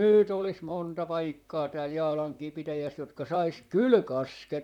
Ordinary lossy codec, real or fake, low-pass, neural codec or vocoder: none; fake; 19.8 kHz; vocoder, 44.1 kHz, 128 mel bands, Pupu-Vocoder